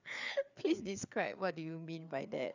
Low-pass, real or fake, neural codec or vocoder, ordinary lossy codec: 7.2 kHz; fake; codec, 16 kHz, 4 kbps, FreqCodec, larger model; none